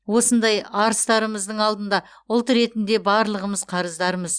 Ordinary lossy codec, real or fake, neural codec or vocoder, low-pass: Opus, 64 kbps; real; none; 9.9 kHz